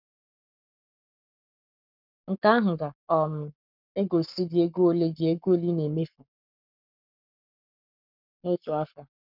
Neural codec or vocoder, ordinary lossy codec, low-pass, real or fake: codec, 44.1 kHz, 7.8 kbps, Pupu-Codec; none; 5.4 kHz; fake